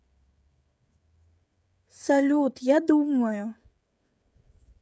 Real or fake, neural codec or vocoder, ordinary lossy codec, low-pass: fake; codec, 16 kHz, 16 kbps, FreqCodec, smaller model; none; none